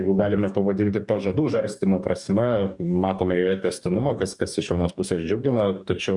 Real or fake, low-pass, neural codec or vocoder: fake; 10.8 kHz; codec, 44.1 kHz, 2.6 kbps, DAC